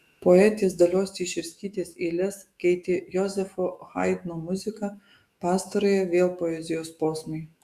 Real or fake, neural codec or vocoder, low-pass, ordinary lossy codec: fake; autoencoder, 48 kHz, 128 numbers a frame, DAC-VAE, trained on Japanese speech; 14.4 kHz; Opus, 64 kbps